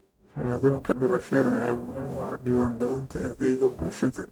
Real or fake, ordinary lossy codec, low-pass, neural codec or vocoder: fake; none; 19.8 kHz; codec, 44.1 kHz, 0.9 kbps, DAC